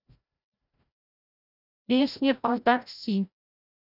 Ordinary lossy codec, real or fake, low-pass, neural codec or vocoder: none; fake; 5.4 kHz; codec, 16 kHz, 0.5 kbps, FreqCodec, larger model